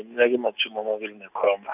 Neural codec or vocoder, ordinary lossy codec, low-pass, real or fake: none; none; 3.6 kHz; real